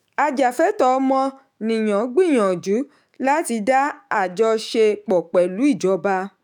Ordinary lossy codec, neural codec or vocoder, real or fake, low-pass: none; autoencoder, 48 kHz, 128 numbers a frame, DAC-VAE, trained on Japanese speech; fake; 19.8 kHz